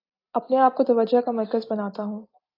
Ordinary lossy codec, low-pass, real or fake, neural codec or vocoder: AAC, 32 kbps; 5.4 kHz; real; none